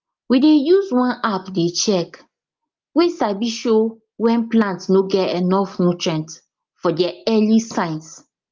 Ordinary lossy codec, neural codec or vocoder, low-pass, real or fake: Opus, 32 kbps; none; 7.2 kHz; real